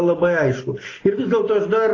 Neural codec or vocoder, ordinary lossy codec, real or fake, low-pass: none; AAC, 32 kbps; real; 7.2 kHz